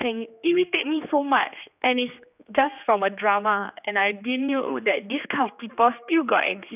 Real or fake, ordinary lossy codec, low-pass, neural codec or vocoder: fake; none; 3.6 kHz; codec, 16 kHz, 2 kbps, X-Codec, HuBERT features, trained on general audio